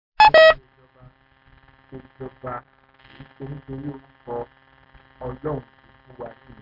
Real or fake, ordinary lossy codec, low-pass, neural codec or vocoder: real; MP3, 48 kbps; 5.4 kHz; none